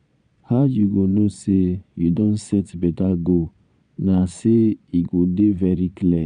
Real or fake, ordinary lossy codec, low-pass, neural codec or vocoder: fake; none; 9.9 kHz; vocoder, 22.05 kHz, 80 mel bands, Vocos